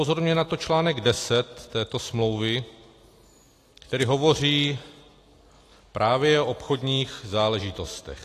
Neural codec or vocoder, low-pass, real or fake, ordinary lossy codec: none; 14.4 kHz; real; AAC, 48 kbps